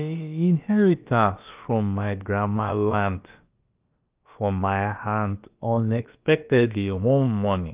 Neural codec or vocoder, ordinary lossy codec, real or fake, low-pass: codec, 16 kHz, about 1 kbps, DyCAST, with the encoder's durations; Opus, 24 kbps; fake; 3.6 kHz